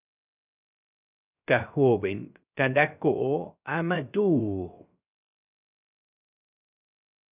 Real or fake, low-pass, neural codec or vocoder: fake; 3.6 kHz; codec, 16 kHz, 0.3 kbps, FocalCodec